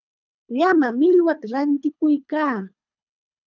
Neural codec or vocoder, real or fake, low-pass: codec, 24 kHz, 3 kbps, HILCodec; fake; 7.2 kHz